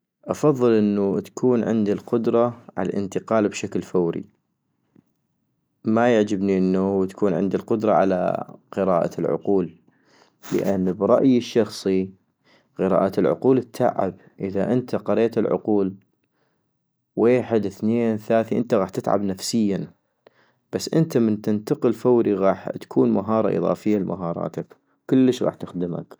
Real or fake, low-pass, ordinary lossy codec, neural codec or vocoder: real; none; none; none